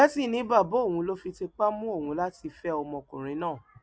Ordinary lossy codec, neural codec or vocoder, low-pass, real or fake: none; none; none; real